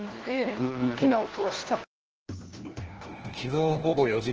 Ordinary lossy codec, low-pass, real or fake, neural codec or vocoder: Opus, 16 kbps; 7.2 kHz; fake; codec, 16 kHz, 1 kbps, FunCodec, trained on LibriTTS, 50 frames a second